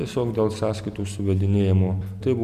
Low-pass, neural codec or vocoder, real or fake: 14.4 kHz; none; real